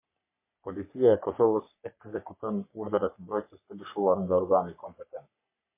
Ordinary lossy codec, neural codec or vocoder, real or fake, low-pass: MP3, 24 kbps; codec, 44.1 kHz, 3.4 kbps, Pupu-Codec; fake; 3.6 kHz